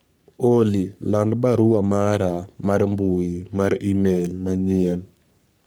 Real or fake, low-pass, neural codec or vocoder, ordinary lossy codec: fake; none; codec, 44.1 kHz, 3.4 kbps, Pupu-Codec; none